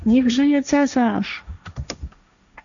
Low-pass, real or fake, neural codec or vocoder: 7.2 kHz; fake; codec, 16 kHz, 1.1 kbps, Voila-Tokenizer